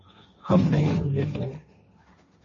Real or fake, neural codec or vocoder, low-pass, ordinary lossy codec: fake; codec, 16 kHz, 1.1 kbps, Voila-Tokenizer; 7.2 kHz; MP3, 32 kbps